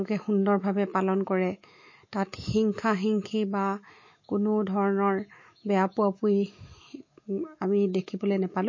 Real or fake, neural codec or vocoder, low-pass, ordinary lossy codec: fake; autoencoder, 48 kHz, 128 numbers a frame, DAC-VAE, trained on Japanese speech; 7.2 kHz; MP3, 32 kbps